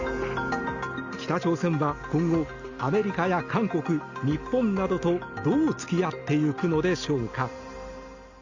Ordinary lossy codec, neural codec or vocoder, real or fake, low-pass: Opus, 64 kbps; none; real; 7.2 kHz